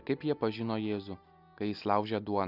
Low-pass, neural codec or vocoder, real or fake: 5.4 kHz; none; real